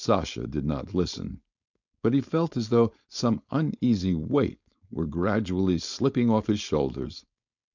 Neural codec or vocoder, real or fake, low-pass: codec, 16 kHz, 4.8 kbps, FACodec; fake; 7.2 kHz